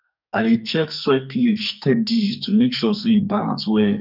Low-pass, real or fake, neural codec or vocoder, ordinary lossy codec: 5.4 kHz; fake; codec, 44.1 kHz, 2.6 kbps, SNAC; none